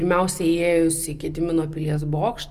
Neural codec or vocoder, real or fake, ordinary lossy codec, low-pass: none; real; Opus, 32 kbps; 14.4 kHz